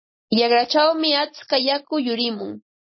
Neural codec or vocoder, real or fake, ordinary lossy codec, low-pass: none; real; MP3, 24 kbps; 7.2 kHz